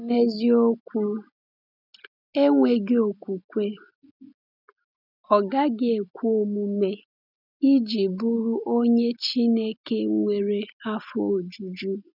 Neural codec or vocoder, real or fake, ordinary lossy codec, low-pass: none; real; none; 5.4 kHz